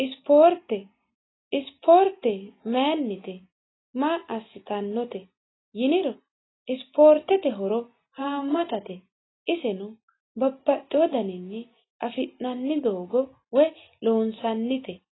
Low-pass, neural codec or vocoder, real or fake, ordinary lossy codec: 7.2 kHz; none; real; AAC, 16 kbps